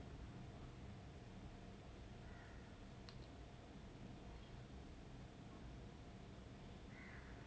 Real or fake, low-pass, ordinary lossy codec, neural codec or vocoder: real; none; none; none